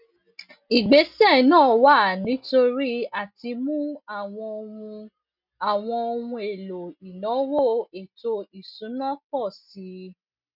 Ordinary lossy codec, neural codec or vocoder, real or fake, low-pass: none; none; real; 5.4 kHz